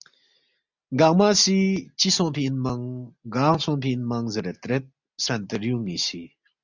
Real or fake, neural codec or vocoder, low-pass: real; none; 7.2 kHz